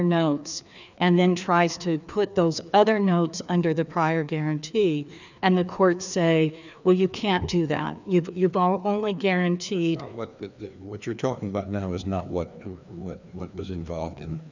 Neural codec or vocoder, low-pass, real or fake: codec, 16 kHz, 2 kbps, FreqCodec, larger model; 7.2 kHz; fake